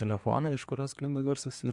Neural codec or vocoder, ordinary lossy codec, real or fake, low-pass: codec, 24 kHz, 1 kbps, SNAC; MP3, 64 kbps; fake; 10.8 kHz